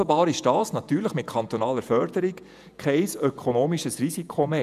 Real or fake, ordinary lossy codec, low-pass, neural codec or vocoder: fake; none; 14.4 kHz; vocoder, 48 kHz, 128 mel bands, Vocos